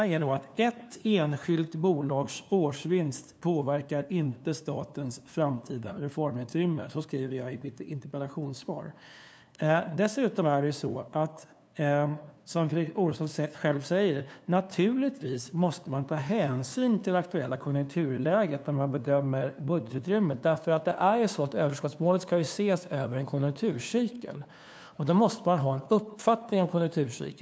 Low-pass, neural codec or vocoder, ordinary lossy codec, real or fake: none; codec, 16 kHz, 2 kbps, FunCodec, trained on LibriTTS, 25 frames a second; none; fake